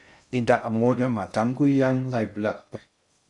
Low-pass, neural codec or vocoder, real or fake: 10.8 kHz; codec, 16 kHz in and 24 kHz out, 0.6 kbps, FocalCodec, streaming, 2048 codes; fake